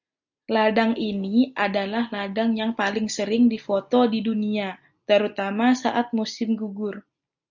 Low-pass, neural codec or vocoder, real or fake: 7.2 kHz; none; real